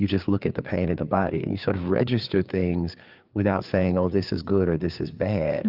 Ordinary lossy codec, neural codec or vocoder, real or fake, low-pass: Opus, 24 kbps; codec, 16 kHz, 4 kbps, FreqCodec, larger model; fake; 5.4 kHz